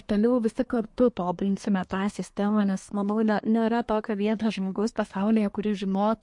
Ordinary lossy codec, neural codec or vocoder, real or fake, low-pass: MP3, 48 kbps; codec, 24 kHz, 1 kbps, SNAC; fake; 10.8 kHz